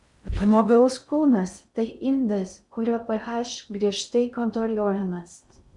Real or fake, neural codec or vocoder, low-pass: fake; codec, 16 kHz in and 24 kHz out, 0.6 kbps, FocalCodec, streaming, 4096 codes; 10.8 kHz